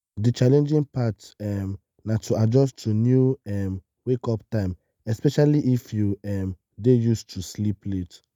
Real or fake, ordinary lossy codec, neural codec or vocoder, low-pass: real; none; none; 19.8 kHz